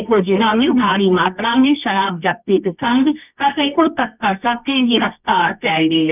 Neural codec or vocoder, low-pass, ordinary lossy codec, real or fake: codec, 24 kHz, 0.9 kbps, WavTokenizer, medium music audio release; 3.6 kHz; none; fake